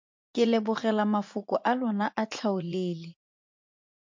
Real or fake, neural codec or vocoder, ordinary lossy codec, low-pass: fake; vocoder, 44.1 kHz, 80 mel bands, Vocos; MP3, 48 kbps; 7.2 kHz